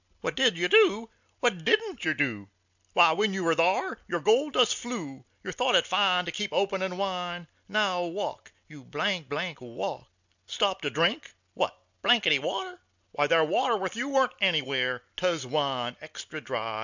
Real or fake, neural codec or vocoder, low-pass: real; none; 7.2 kHz